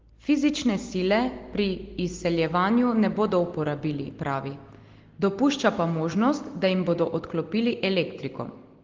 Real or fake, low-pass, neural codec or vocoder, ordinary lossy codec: real; 7.2 kHz; none; Opus, 16 kbps